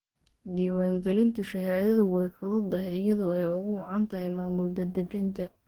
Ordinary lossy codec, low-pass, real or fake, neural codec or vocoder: Opus, 24 kbps; 19.8 kHz; fake; codec, 44.1 kHz, 2.6 kbps, DAC